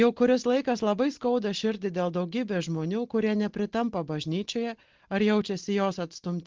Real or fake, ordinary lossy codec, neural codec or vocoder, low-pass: real; Opus, 16 kbps; none; 7.2 kHz